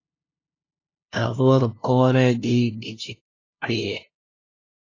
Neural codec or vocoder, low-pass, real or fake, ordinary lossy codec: codec, 16 kHz, 0.5 kbps, FunCodec, trained on LibriTTS, 25 frames a second; 7.2 kHz; fake; AAC, 32 kbps